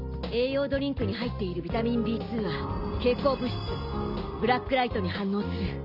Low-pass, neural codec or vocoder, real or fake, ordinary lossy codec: 5.4 kHz; none; real; none